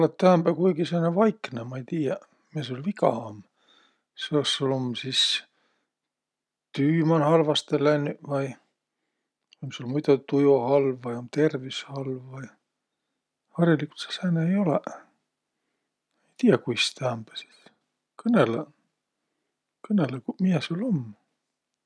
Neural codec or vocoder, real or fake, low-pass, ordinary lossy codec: none; real; none; none